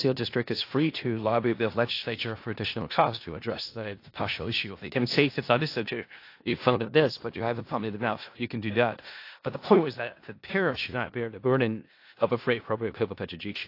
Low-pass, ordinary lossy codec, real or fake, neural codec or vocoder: 5.4 kHz; AAC, 32 kbps; fake; codec, 16 kHz in and 24 kHz out, 0.4 kbps, LongCat-Audio-Codec, four codebook decoder